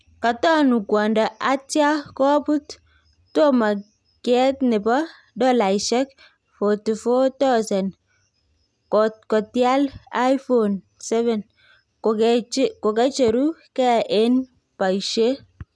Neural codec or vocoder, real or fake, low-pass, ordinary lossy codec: none; real; none; none